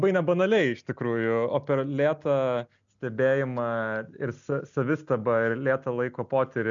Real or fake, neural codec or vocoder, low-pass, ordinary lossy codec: real; none; 7.2 kHz; MP3, 96 kbps